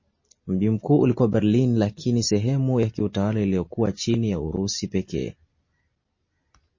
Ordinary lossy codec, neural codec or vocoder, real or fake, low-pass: MP3, 32 kbps; none; real; 7.2 kHz